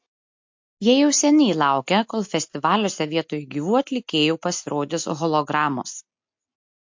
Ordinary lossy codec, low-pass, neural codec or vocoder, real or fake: MP3, 48 kbps; 7.2 kHz; none; real